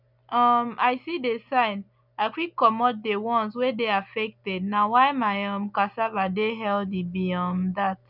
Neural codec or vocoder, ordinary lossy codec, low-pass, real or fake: none; none; 5.4 kHz; real